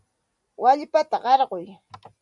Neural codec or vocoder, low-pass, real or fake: none; 10.8 kHz; real